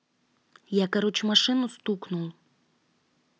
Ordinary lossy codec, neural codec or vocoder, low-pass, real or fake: none; none; none; real